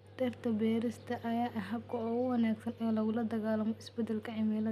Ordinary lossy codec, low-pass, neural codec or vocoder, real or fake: none; 14.4 kHz; none; real